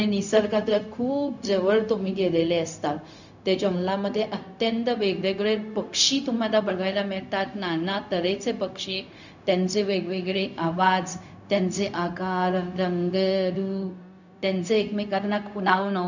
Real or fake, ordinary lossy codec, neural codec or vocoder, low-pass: fake; none; codec, 16 kHz, 0.4 kbps, LongCat-Audio-Codec; 7.2 kHz